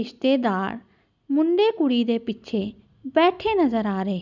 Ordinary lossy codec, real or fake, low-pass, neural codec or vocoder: none; real; 7.2 kHz; none